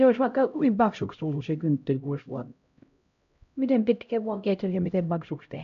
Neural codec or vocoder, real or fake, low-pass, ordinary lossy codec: codec, 16 kHz, 0.5 kbps, X-Codec, HuBERT features, trained on LibriSpeech; fake; 7.2 kHz; none